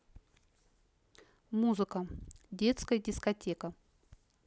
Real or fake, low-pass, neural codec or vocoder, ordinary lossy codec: real; none; none; none